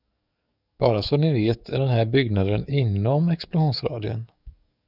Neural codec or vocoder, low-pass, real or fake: codec, 44.1 kHz, 7.8 kbps, DAC; 5.4 kHz; fake